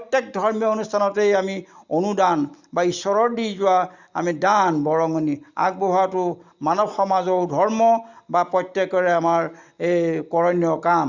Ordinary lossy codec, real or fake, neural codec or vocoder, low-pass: Opus, 64 kbps; real; none; 7.2 kHz